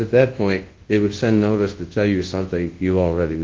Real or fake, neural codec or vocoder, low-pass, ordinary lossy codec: fake; codec, 24 kHz, 0.9 kbps, WavTokenizer, large speech release; 7.2 kHz; Opus, 16 kbps